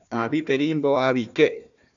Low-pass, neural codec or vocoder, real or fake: 7.2 kHz; codec, 16 kHz, 1 kbps, FunCodec, trained on Chinese and English, 50 frames a second; fake